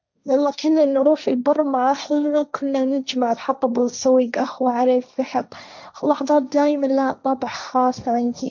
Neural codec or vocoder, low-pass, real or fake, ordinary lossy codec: codec, 16 kHz, 1.1 kbps, Voila-Tokenizer; 7.2 kHz; fake; none